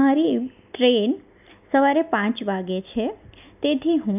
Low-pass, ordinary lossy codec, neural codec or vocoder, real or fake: 3.6 kHz; none; none; real